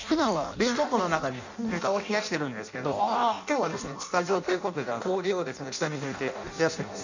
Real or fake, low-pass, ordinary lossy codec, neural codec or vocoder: fake; 7.2 kHz; none; codec, 16 kHz in and 24 kHz out, 0.6 kbps, FireRedTTS-2 codec